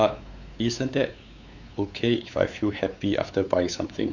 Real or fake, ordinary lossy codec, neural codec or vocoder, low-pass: fake; none; codec, 16 kHz, 4 kbps, X-Codec, WavLM features, trained on Multilingual LibriSpeech; 7.2 kHz